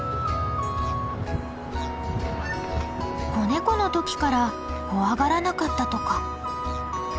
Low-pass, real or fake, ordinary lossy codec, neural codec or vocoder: none; real; none; none